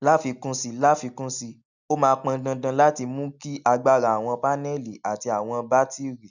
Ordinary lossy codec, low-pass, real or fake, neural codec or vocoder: none; 7.2 kHz; real; none